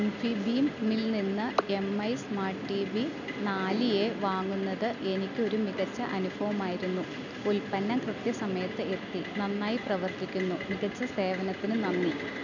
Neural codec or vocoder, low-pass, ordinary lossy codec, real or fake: none; 7.2 kHz; none; real